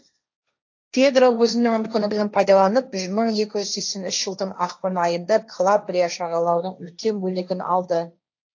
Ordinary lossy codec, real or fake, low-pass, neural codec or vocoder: AAC, 48 kbps; fake; 7.2 kHz; codec, 16 kHz, 1.1 kbps, Voila-Tokenizer